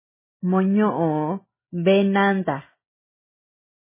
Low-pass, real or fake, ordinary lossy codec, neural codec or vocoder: 3.6 kHz; real; MP3, 16 kbps; none